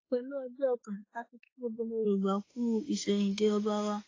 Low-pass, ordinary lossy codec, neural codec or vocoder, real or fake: 7.2 kHz; AAC, 32 kbps; autoencoder, 48 kHz, 32 numbers a frame, DAC-VAE, trained on Japanese speech; fake